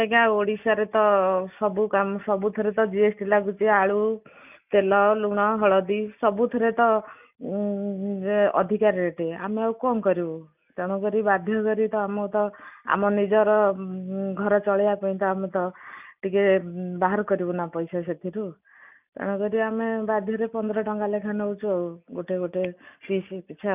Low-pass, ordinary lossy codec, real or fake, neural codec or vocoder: 3.6 kHz; none; real; none